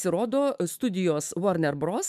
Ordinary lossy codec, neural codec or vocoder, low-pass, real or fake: MP3, 96 kbps; autoencoder, 48 kHz, 128 numbers a frame, DAC-VAE, trained on Japanese speech; 14.4 kHz; fake